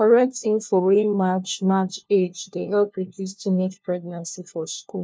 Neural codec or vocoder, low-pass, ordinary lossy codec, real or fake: codec, 16 kHz, 1 kbps, FunCodec, trained on LibriTTS, 50 frames a second; none; none; fake